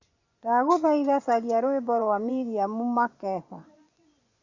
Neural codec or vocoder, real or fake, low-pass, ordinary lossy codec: vocoder, 24 kHz, 100 mel bands, Vocos; fake; 7.2 kHz; Opus, 64 kbps